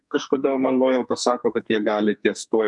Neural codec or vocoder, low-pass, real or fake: codec, 44.1 kHz, 2.6 kbps, SNAC; 10.8 kHz; fake